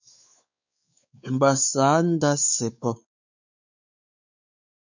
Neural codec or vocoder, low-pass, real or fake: codec, 16 kHz, 4 kbps, X-Codec, WavLM features, trained on Multilingual LibriSpeech; 7.2 kHz; fake